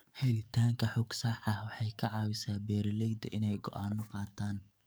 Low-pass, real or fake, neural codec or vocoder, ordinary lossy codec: none; fake; codec, 44.1 kHz, 7.8 kbps, DAC; none